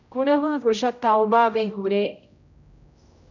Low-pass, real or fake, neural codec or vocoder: 7.2 kHz; fake; codec, 16 kHz, 0.5 kbps, X-Codec, HuBERT features, trained on general audio